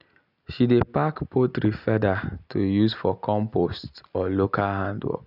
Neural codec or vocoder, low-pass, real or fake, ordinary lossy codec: none; 5.4 kHz; real; none